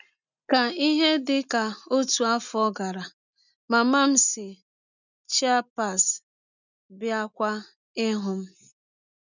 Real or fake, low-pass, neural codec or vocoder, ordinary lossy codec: real; 7.2 kHz; none; none